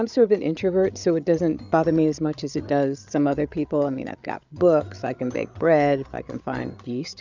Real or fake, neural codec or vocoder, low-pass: fake; codec, 16 kHz, 8 kbps, FreqCodec, larger model; 7.2 kHz